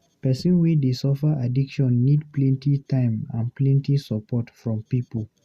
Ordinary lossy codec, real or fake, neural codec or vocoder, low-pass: Opus, 64 kbps; real; none; 14.4 kHz